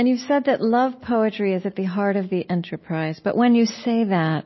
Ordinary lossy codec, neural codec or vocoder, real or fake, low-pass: MP3, 24 kbps; none; real; 7.2 kHz